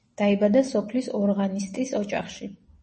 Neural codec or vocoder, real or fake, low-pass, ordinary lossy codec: none; real; 10.8 kHz; MP3, 32 kbps